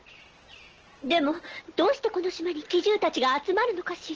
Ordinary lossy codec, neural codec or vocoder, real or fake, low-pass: Opus, 16 kbps; none; real; 7.2 kHz